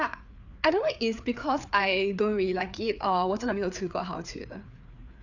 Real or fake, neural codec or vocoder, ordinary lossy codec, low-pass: fake; codec, 16 kHz, 4 kbps, FreqCodec, larger model; none; 7.2 kHz